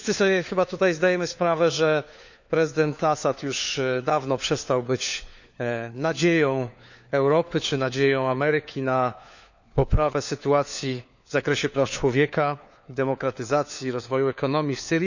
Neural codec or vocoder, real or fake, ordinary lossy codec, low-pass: codec, 16 kHz, 4 kbps, FunCodec, trained on LibriTTS, 50 frames a second; fake; none; 7.2 kHz